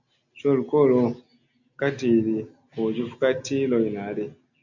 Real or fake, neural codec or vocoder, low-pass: real; none; 7.2 kHz